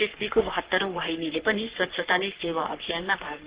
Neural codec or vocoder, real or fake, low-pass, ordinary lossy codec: codec, 44.1 kHz, 3.4 kbps, Pupu-Codec; fake; 3.6 kHz; Opus, 16 kbps